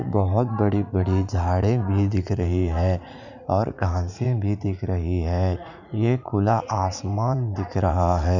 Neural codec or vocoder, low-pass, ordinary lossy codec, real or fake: vocoder, 44.1 kHz, 80 mel bands, Vocos; 7.2 kHz; none; fake